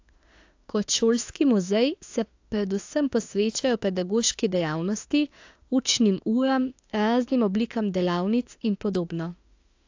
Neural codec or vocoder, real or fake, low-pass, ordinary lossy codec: autoencoder, 48 kHz, 32 numbers a frame, DAC-VAE, trained on Japanese speech; fake; 7.2 kHz; AAC, 48 kbps